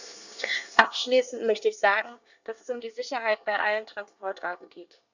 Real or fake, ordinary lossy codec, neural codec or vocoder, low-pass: fake; none; codec, 24 kHz, 1 kbps, SNAC; 7.2 kHz